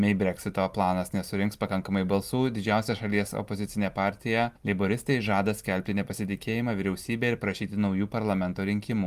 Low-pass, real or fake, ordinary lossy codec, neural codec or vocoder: 14.4 kHz; real; Opus, 32 kbps; none